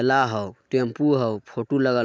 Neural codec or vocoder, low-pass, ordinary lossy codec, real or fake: none; none; none; real